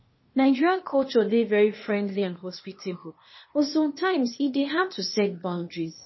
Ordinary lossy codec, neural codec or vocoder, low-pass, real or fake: MP3, 24 kbps; codec, 16 kHz, 0.8 kbps, ZipCodec; 7.2 kHz; fake